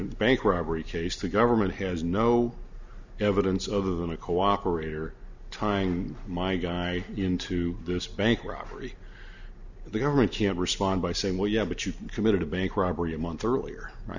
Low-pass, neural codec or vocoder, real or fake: 7.2 kHz; none; real